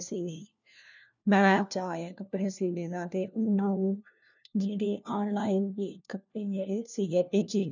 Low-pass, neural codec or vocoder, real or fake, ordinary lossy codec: 7.2 kHz; codec, 16 kHz, 1 kbps, FunCodec, trained on LibriTTS, 50 frames a second; fake; none